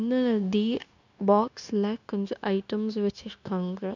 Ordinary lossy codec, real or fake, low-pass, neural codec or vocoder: none; fake; 7.2 kHz; codec, 16 kHz, 0.9 kbps, LongCat-Audio-Codec